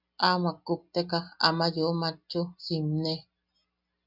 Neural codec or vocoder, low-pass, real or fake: none; 5.4 kHz; real